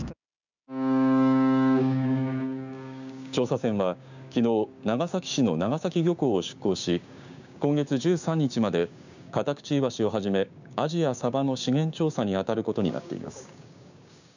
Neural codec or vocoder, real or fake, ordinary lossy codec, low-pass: codec, 16 kHz, 6 kbps, DAC; fake; none; 7.2 kHz